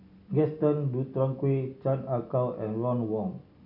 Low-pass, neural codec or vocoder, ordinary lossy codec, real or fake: 5.4 kHz; none; none; real